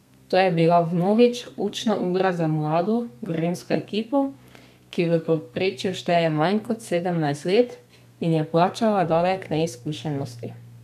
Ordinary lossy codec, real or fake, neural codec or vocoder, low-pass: none; fake; codec, 32 kHz, 1.9 kbps, SNAC; 14.4 kHz